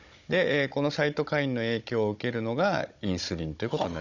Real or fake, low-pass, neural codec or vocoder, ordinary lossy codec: fake; 7.2 kHz; codec, 16 kHz, 16 kbps, FunCodec, trained on Chinese and English, 50 frames a second; none